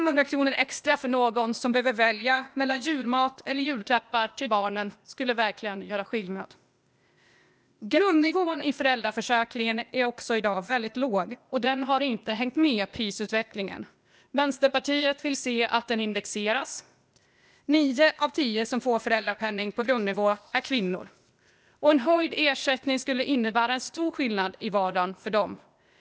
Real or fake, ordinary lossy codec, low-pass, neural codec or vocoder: fake; none; none; codec, 16 kHz, 0.8 kbps, ZipCodec